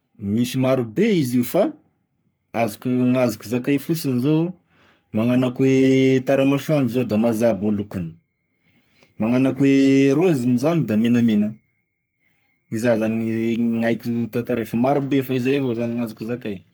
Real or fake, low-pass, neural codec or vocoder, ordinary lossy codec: fake; none; codec, 44.1 kHz, 3.4 kbps, Pupu-Codec; none